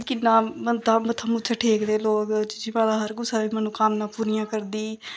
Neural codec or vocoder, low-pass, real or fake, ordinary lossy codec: none; none; real; none